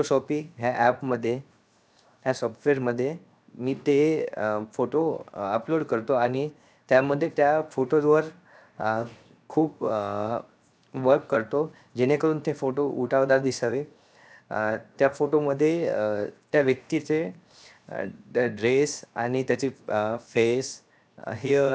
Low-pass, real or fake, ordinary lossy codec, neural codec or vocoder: none; fake; none; codec, 16 kHz, 0.7 kbps, FocalCodec